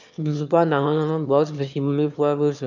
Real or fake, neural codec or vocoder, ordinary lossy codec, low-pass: fake; autoencoder, 22.05 kHz, a latent of 192 numbers a frame, VITS, trained on one speaker; none; 7.2 kHz